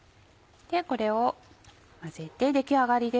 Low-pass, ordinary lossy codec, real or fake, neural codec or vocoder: none; none; real; none